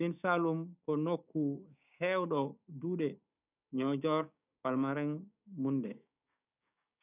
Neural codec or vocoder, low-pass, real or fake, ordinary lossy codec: codec, 16 kHz, 6 kbps, DAC; 3.6 kHz; fake; none